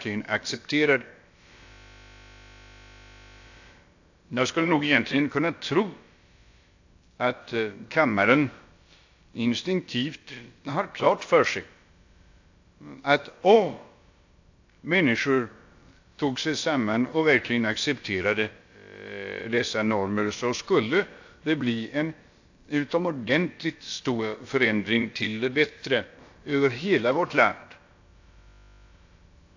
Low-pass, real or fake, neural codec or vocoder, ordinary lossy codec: 7.2 kHz; fake; codec, 16 kHz, about 1 kbps, DyCAST, with the encoder's durations; AAC, 48 kbps